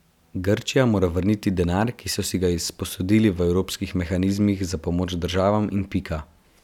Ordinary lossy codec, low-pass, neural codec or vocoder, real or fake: none; 19.8 kHz; none; real